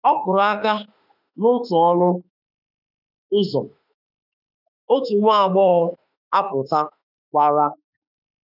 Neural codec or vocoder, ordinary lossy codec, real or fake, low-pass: autoencoder, 48 kHz, 32 numbers a frame, DAC-VAE, trained on Japanese speech; none; fake; 5.4 kHz